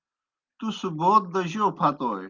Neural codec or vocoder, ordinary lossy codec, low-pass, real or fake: none; Opus, 32 kbps; 7.2 kHz; real